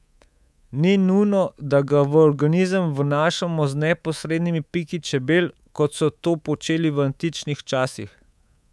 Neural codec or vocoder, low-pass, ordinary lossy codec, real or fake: codec, 24 kHz, 3.1 kbps, DualCodec; none; none; fake